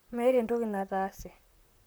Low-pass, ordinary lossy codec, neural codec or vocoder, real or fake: none; none; vocoder, 44.1 kHz, 128 mel bands, Pupu-Vocoder; fake